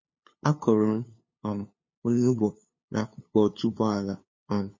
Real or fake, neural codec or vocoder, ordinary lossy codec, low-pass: fake; codec, 16 kHz, 2 kbps, FunCodec, trained on LibriTTS, 25 frames a second; MP3, 32 kbps; 7.2 kHz